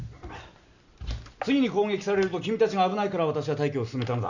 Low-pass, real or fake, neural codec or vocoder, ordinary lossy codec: 7.2 kHz; real; none; none